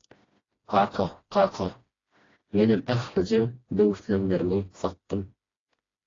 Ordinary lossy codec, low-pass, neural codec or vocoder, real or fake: AAC, 32 kbps; 7.2 kHz; codec, 16 kHz, 1 kbps, FreqCodec, smaller model; fake